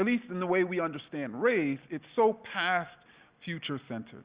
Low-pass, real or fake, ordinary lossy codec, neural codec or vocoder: 3.6 kHz; real; Opus, 64 kbps; none